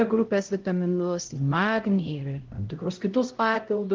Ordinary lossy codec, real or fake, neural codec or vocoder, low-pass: Opus, 16 kbps; fake; codec, 16 kHz, 0.5 kbps, X-Codec, HuBERT features, trained on LibriSpeech; 7.2 kHz